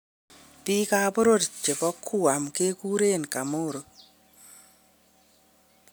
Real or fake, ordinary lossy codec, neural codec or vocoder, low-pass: real; none; none; none